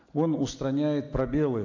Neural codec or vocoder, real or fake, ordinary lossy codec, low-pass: none; real; AAC, 48 kbps; 7.2 kHz